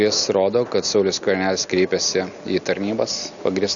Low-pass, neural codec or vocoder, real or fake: 7.2 kHz; none; real